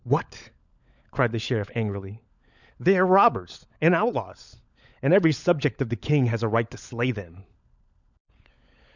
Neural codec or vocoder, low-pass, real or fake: codec, 16 kHz, 16 kbps, FunCodec, trained on LibriTTS, 50 frames a second; 7.2 kHz; fake